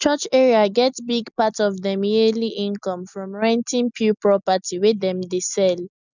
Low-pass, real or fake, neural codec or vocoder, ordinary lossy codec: 7.2 kHz; real; none; none